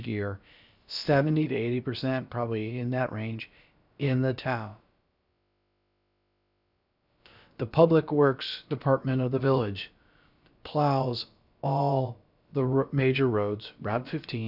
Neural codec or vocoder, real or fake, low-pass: codec, 16 kHz, about 1 kbps, DyCAST, with the encoder's durations; fake; 5.4 kHz